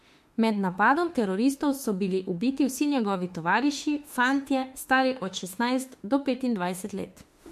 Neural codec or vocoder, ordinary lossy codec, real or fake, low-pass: autoencoder, 48 kHz, 32 numbers a frame, DAC-VAE, trained on Japanese speech; MP3, 64 kbps; fake; 14.4 kHz